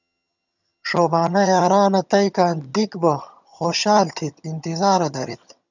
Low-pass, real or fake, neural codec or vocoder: 7.2 kHz; fake; vocoder, 22.05 kHz, 80 mel bands, HiFi-GAN